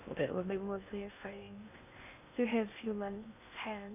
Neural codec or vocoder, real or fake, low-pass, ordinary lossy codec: codec, 16 kHz in and 24 kHz out, 0.6 kbps, FocalCodec, streaming, 2048 codes; fake; 3.6 kHz; none